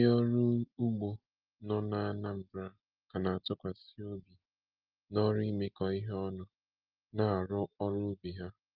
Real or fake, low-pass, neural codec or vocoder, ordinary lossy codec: real; 5.4 kHz; none; Opus, 32 kbps